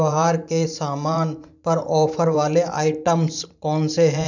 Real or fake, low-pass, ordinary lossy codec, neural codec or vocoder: fake; 7.2 kHz; none; vocoder, 44.1 kHz, 128 mel bands every 512 samples, BigVGAN v2